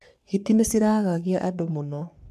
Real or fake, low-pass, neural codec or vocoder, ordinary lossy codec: fake; 14.4 kHz; codec, 44.1 kHz, 3.4 kbps, Pupu-Codec; none